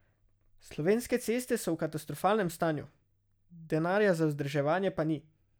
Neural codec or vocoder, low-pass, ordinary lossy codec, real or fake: none; none; none; real